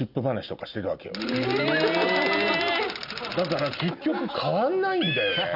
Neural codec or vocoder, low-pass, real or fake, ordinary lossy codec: none; 5.4 kHz; real; none